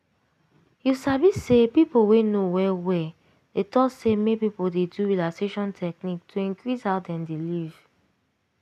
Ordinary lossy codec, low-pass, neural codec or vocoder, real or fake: none; 14.4 kHz; none; real